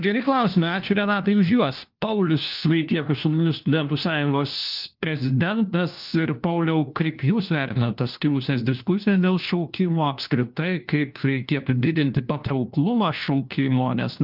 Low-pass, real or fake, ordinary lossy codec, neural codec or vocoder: 5.4 kHz; fake; Opus, 24 kbps; codec, 16 kHz, 1 kbps, FunCodec, trained on LibriTTS, 50 frames a second